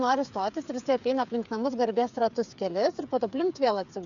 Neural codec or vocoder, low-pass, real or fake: codec, 16 kHz, 8 kbps, FreqCodec, smaller model; 7.2 kHz; fake